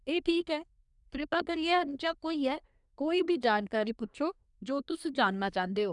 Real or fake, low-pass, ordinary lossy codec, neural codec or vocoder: fake; 10.8 kHz; none; codec, 24 kHz, 1 kbps, SNAC